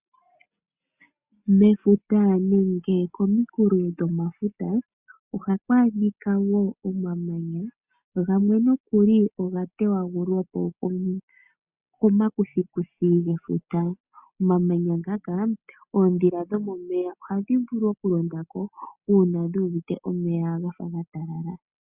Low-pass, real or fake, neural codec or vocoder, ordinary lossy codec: 3.6 kHz; real; none; Opus, 64 kbps